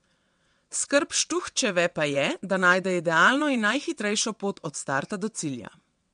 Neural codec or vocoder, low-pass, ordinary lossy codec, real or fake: vocoder, 22.05 kHz, 80 mel bands, WaveNeXt; 9.9 kHz; MP3, 64 kbps; fake